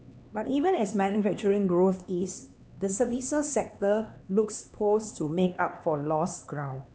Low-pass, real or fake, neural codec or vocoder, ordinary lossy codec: none; fake; codec, 16 kHz, 2 kbps, X-Codec, HuBERT features, trained on LibriSpeech; none